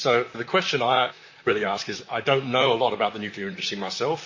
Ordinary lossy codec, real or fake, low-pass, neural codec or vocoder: MP3, 32 kbps; fake; 7.2 kHz; vocoder, 44.1 kHz, 128 mel bands, Pupu-Vocoder